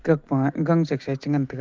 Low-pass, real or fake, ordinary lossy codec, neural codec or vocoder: 7.2 kHz; real; Opus, 24 kbps; none